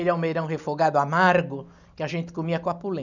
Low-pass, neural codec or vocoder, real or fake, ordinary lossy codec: 7.2 kHz; none; real; none